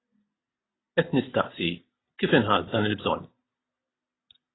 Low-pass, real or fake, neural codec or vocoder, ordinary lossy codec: 7.2 kHz; real; none; AAC, 16 kbps